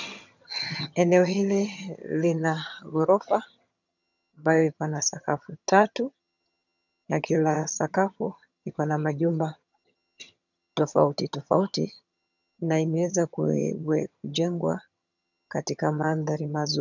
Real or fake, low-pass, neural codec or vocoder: fake; 7.2 kHz; vocoder, 22.05 kHz, 80 mel bands, HiFi-GAN